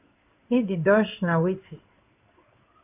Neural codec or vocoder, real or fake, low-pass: codec, 16 kHz in and 24 kHz out, 1 kbps, XY-Tokenizer; fake; 3.6 kHz